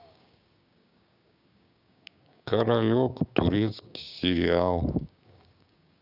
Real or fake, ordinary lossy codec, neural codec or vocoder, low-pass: fake; none; codec, 16 kHz, 6 kbps, DAC; 5.4 kHz